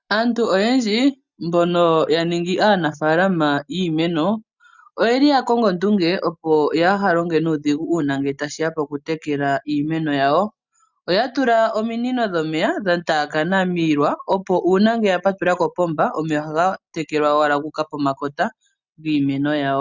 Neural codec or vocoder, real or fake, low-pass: none; real; 7.2 kHz